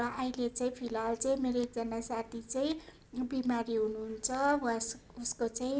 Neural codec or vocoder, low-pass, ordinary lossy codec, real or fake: none; none; none; real